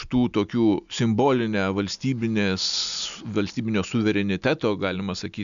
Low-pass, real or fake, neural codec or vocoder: 7.2 kHz; real; none